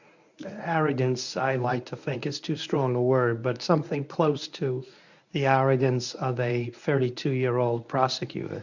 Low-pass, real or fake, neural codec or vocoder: 7.2 kHz; fake; codec, 24 kHz, 0.9 kbps, WavTokenizer, medium speech release version 1